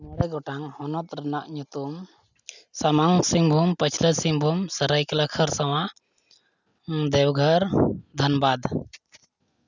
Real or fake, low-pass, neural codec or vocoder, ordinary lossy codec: real; 7.2 kHz; none; none